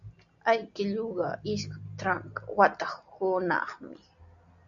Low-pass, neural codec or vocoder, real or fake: 7.2 kHz; none; real